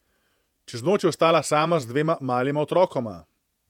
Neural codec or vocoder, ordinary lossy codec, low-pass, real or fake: vocoder, 48 kHz, 128 mel bands, Vocos; MP3, 96 kbps; 19.8 kHz; fake